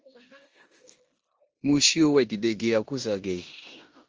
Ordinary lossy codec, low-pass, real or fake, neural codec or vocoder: Opus, 24 kbps; 7.2 kHz; fake; codec, 16 kHz in and 24 kHz out, 0.9 kbps, LongCat-Audio-Codec, four codebook decoder